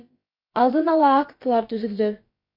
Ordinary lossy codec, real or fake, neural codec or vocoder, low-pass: MP3, 32 kbps; fake; codec, 16 kHz, about 1 kbps, DyCAST, with the encoder's durations; 5.4 kHz